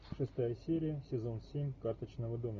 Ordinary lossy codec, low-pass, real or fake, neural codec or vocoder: MP3, 64 kbps; 7.2 kHz; real; none